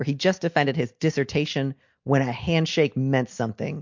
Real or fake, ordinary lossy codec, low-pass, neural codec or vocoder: real; MP3, 48 kbps; 7.2 kHz; none